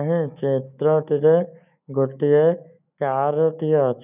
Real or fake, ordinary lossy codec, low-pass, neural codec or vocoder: fake; none; 3.6 kHz; autoencoder, 48 kHz, 128 numbers a frame, DAC-VAE, trained on Japanese speech